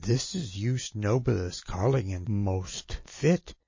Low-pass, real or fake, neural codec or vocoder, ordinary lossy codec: 7.2 kHz; real; none; MP3, 32 kbps